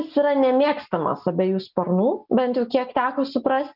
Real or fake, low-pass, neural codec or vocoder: real; 5.4 kHz; none